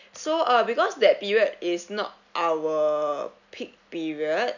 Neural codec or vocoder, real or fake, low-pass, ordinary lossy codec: none; real; 7.2 kHz; none